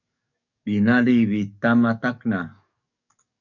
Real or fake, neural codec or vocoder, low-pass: fake; codec, 44.1 kHz, 7.8 kbps, DAC; 7.2 kHz